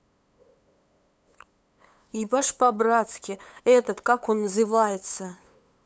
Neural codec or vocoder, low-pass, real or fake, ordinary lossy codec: codec, 16 kHz, 8 kbps, FunCodec, trained on LibriTTS, 25 frames a second; none; fake; none